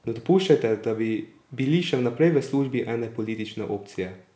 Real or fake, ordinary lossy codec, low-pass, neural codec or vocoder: real; none; none; none